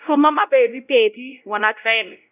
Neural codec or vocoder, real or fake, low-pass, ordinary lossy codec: codec, 16 kHz, 0.5 kbps, X-Codec, WavLM features, trained on Multilingual LibriSpeech; fake; 3.6 kHz; none